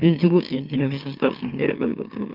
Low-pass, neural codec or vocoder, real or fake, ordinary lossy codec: 5.4 kHz; autoencoder, 44.1 kHz, a latent of 192 numbers a frame, MeloTTS; fake; Opus, 24 kbps